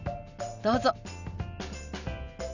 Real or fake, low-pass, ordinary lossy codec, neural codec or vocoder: real; 7.2 kHz; none; none